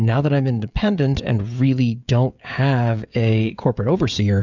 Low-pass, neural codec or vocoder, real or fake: 7.2 kHz; codec, 16 kHz, 16 kbps, FreqCodec, smaller model; fake